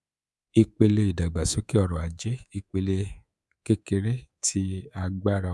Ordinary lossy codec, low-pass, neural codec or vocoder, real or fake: none; none; codec, 24 kHz, 3.1 kbps, DualCodec; fake